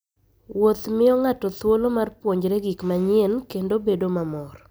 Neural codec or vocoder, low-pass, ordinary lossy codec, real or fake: none; none; none; real